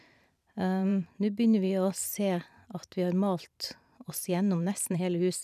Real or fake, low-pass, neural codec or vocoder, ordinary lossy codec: fake; 14.4 kHz; vocoder, 44.1 kHz, 128 mel bands every 512 samples, BigVGAN v2; none